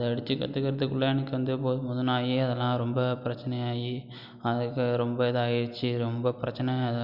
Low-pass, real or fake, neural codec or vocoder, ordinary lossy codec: 5.4 kHz; real; none; none